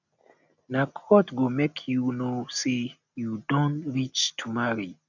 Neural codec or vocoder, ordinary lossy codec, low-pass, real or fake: none; none; 7.2 kHz; real